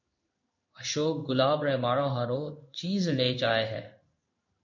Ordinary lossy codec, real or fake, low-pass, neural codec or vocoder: MP3, 48 kbps; fake; 7.2 kHz; codec, 16 kHz in and 24 kHz out, 1 kbps, XY-Tokenizer